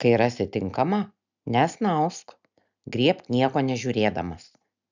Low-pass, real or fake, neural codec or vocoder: 7.2 kHz; real; none